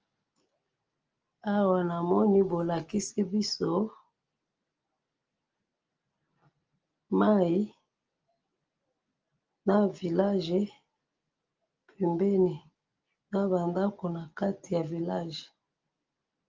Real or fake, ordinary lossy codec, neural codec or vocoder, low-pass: real; Opus, 24 kbps; none; 7.2 kHz